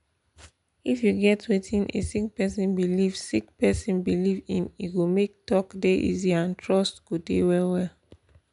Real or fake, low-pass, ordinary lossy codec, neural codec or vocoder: real; 10.8 kHz; none; none